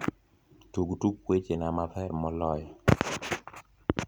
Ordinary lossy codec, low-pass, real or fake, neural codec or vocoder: none; none; real; none